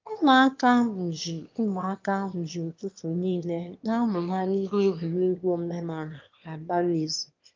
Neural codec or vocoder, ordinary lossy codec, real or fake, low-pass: autoencoder, 22.05 kHz, a latent of 192 numbers a frame, VITS, trained on one speaker; Opus, 32 kbps; fake; 7.2 kHz